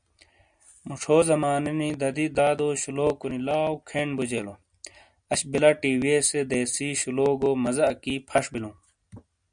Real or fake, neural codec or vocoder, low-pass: real; none; 9.9 kHz